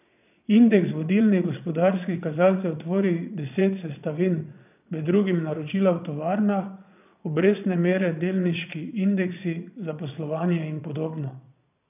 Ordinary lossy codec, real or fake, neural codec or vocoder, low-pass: none; fake; vocoder, 22.05 kHz, 80 mel bands, Vocos; 3.6 kHz